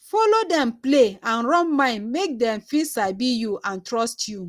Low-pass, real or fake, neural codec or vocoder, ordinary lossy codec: 14.4 kHz; real; none; Opus, 32 kbps